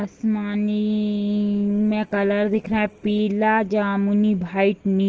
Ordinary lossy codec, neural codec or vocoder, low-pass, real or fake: Opus, 16 kbps; none; 7.2 kHz; real